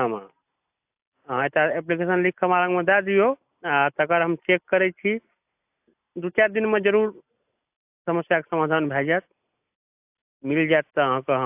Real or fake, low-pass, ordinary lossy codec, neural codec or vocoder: real; 3.6 kHz; AAC, 32 kbps; none